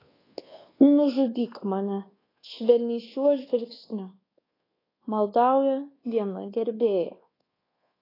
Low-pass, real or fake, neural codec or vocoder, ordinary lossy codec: 5.4 kHz; fake; codec, 24 kHz, 1.2 kbps, DualCodec; AAC, 24 kbps